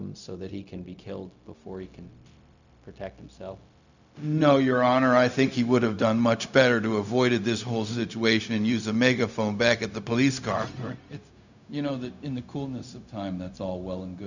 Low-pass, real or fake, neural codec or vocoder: 7.2 kHz; fake; codec, 16 kHz, 0.4 kbps, LongCat-Audio-Codec